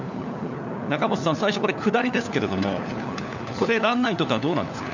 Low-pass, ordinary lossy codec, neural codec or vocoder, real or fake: 7.2 kHz; none; codec, 16 kHz, 4 kbps, FunCodec, trained on LibriTTS, 50 frames a second; fake